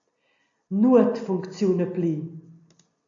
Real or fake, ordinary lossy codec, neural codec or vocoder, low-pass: real; AAC, 64 kbps; none; 7.2 kHz